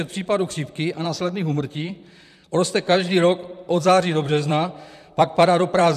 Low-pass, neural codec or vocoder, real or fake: 14.4 kHz; vocoder, 44.1 kHz, 128 mel bands, Pupu-Vocoder; fake